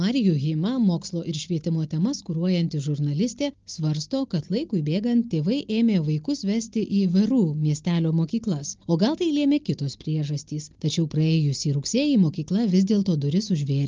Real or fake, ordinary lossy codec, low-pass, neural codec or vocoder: real; Opus, 24 kbps; 7.2 kHz; none